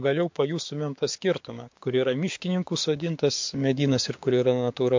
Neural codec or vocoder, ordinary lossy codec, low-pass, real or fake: codec, 16 kHz, 6 kbps, DAC; MP3, 48 kbps; 7.2 kHz; fake